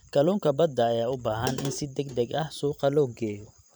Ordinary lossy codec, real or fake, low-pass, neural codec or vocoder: none; fake; none; vocoder, 44.1 kHz, 128 mel bands every 512 samples, BigVGAN v2